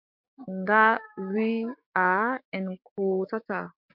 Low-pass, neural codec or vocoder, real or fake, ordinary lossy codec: 5.4 kHz; codec, 16 kHz, 6 kbps, DAC; fake; MP3, 48 kbps